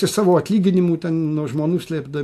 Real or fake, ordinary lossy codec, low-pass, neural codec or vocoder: real; AAC, 64 kbps; 14.4 kHz; none